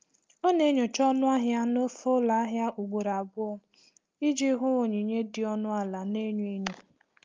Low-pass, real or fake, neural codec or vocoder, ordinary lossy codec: 7.2 kHz; real; none; Opus, 32 kbps